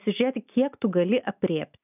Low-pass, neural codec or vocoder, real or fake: 3.6 kHz; none; real